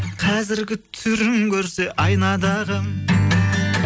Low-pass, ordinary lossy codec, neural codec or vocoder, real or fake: none; none; none; real